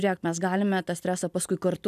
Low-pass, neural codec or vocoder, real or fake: 14.4 kHz; none; real